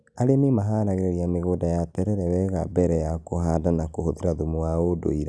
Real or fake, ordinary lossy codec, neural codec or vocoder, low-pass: real; none; none; none